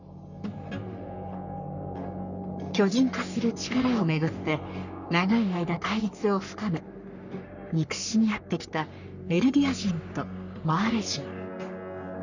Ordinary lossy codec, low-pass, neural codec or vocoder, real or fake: none; 7.2 kHz; codec, 44.1 kHz, 3.4 kbps, Pupu-Codec; fake